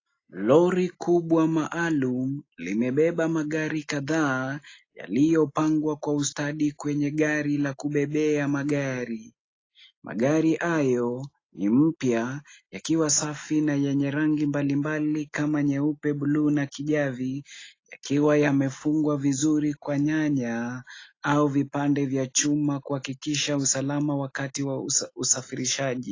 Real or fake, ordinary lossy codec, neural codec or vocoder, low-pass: real; AAC, 32 kbps; none; 7.2 kHz